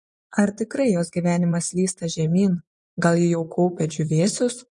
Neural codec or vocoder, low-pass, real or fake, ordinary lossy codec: vocoder, 24 kHz, 100 mel bands, Vocos; 10.8 kHz; fake; MP3, 48 kbps